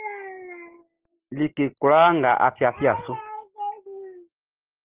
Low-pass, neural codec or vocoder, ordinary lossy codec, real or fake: 3.6 kHz; none; Opus, 16 kbps; real